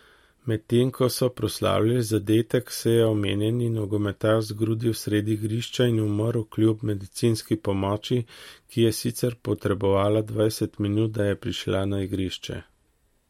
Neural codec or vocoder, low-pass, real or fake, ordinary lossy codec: none; 19.8 kHz; real; MP3, 64 kbps